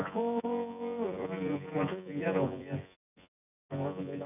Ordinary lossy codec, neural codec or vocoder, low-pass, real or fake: none; vocoder, 24 kHz, 100 mel bands, Vocos; 3.6 kHz; fake